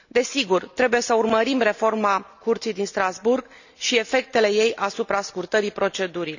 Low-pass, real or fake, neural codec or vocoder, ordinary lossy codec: 7.2 kHz; real; none; none